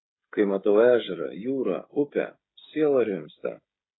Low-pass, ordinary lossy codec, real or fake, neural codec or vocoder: 7.2 kHz; AAC, 16 kbps; fake; codec, 16 kHz, 16 kbps, FreqCodec, smaller model